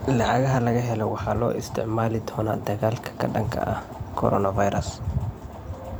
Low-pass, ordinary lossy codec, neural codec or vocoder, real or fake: none; none; vocoder, 44.1 kHz, 128 mel bands every 512 samples, BigVGAN v2; fake